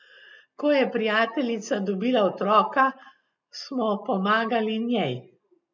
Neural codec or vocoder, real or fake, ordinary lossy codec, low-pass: none; real; none; 7.2 kHz